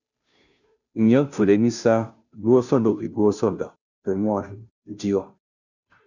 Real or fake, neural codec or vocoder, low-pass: fake; codec, 16 kHz, 0.5 kbps, FunCodec, trained on Chinese and English, 25 frames a second; 7.2 kHz